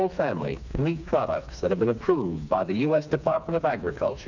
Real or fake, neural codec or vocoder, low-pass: fake; codec, 16 kHz, 2 kbps, FreqCodec, smaller model; 7.2 kHz